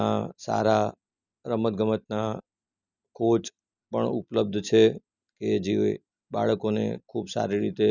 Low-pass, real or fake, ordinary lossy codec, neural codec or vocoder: 7.2 kHz; real; none; none